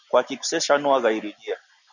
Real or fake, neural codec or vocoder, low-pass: real; none; 7.2 kHz